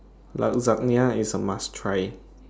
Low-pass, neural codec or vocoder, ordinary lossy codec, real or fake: none; none; none; real